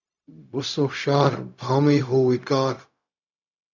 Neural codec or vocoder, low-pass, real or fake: codec, 16 kHz, 0.4 kbps, LongCat-Audio-Codec; 7.2 kHz; fake